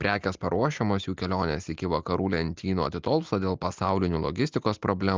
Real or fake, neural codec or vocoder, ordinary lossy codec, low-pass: real; none; Opus, 24 kbps; 7.2 kHz